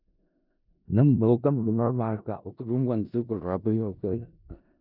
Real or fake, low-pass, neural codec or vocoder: fake; 5.4 kHz; codec, 16 kHz in and 24 kHz out, 0.4 kbps, LongCat-Audio-Codec, four codebook decoder